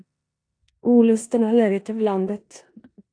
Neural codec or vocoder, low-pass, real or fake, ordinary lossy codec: codec, 16 kHz in and 24 kHz out, 0.9 kbps, LongCat-Audio-Codec, four codebook decoder; 9.9 kHz; fake; AAC, 48 kbps